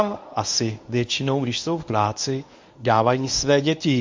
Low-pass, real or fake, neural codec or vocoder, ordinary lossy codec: 7.2 kHz; fake; codec, 24 kHz, 0.9 kbps, WavTokenizer, medium speech release version 1; MP3, 48 kbps